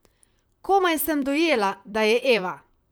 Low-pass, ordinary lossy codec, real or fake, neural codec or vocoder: none; none; fake; vocoder, 44.1 kHz, 128 mel bands, Pupu-Vocoder